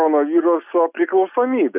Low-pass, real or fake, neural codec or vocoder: 3.6 kHz; real; none